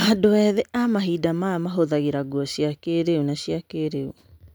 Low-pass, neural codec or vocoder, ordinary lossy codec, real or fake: none; none; none; real